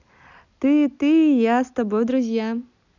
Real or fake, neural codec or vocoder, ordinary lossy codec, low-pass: real; none; none; 7.2 kHz